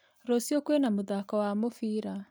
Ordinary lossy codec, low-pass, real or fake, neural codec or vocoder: none; none; real; none